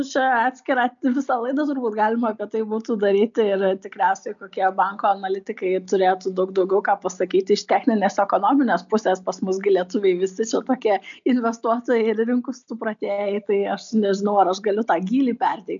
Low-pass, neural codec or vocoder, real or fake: 7.2 kHz; none; real